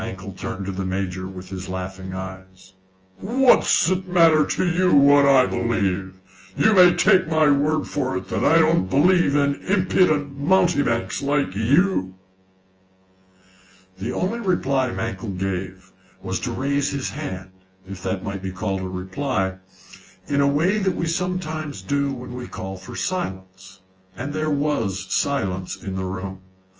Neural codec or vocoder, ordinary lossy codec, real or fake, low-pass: vocoder, 24 kHz, 100 mel bands, Vocos; Opus, 24 kbps; fake; 7.2 kHz